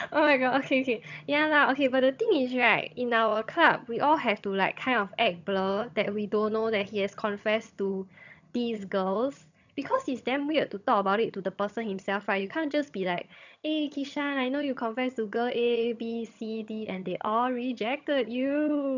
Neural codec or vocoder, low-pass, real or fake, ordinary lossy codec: vocoder, 22.05 kHz, 80 mel bands, HiFi-GAN; 7.2 kHz; fake; none